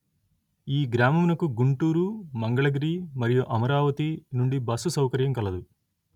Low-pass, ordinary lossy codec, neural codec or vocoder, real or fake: 19.8 kHz; none; none; real